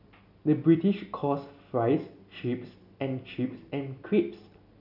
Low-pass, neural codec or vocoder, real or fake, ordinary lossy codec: 5.4 kHz; none; real; none